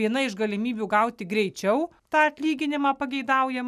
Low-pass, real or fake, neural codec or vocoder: 14.4 kHz; real; none